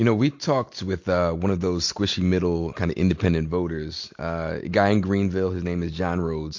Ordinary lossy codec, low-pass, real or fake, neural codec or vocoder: MP3, 48 kbps; 7.2 kHz; real; none